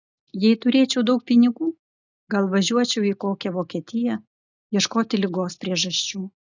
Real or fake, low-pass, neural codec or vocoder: real; 7.2 kHz; none